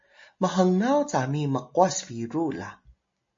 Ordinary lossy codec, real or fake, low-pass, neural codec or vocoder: MP3, 32 kbps; real; 7.2 kHz; none